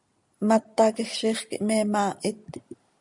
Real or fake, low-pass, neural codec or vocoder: real; 10.8 kHz; none